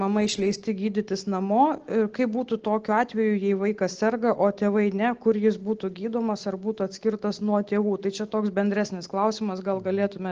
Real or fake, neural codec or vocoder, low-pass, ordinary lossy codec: real; none; 7.2 kHz; Opus, 16 kbps